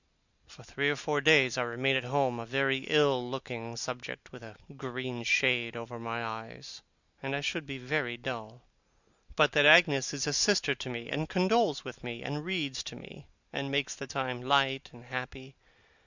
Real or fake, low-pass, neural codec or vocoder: real; 7.2 kHz; none